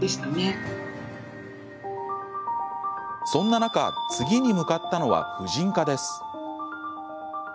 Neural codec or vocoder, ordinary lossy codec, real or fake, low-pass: none; none; real; none